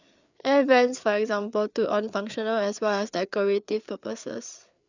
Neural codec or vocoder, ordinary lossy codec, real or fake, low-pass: codec, 16 kHz, 4 kbps, FreqCodec, larger model; none; fake; 7.2 kHz